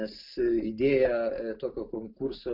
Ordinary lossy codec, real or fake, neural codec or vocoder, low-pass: MP3, 48 kbps; real; none; 5.4 kHz